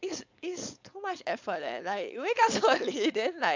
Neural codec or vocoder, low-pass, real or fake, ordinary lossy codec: codec, 16 kHz, 4.8 kbps, FACodec; 7.2 kHz; fake; MP3, 64 kbps